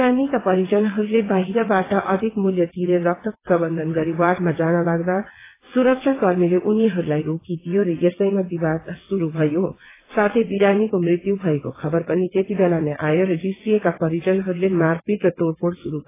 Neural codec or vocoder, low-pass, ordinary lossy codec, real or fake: vocoder, 22.05 kHz, 80 mel bands, WaveNeXt; 3.6 kHz; AAC, 16 kbps; fake